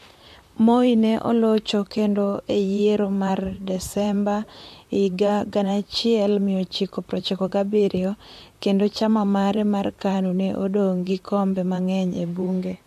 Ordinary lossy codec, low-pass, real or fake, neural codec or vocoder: MP3, 64 kbps; 14.4 kHz; fake; vocoder, 44.1 kHz, 128 mel bands, Pupu-Vocoder